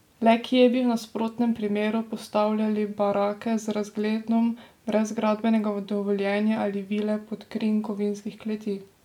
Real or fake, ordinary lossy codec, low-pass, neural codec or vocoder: real; MP3, 96 kbps; 19.8 kHz; none